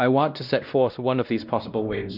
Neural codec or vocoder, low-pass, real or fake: codec, 16 kHz, 1 kbps, X-Codec, WavLM features, trained on Multilingual LibriSpeech; 5.4 kHz; fake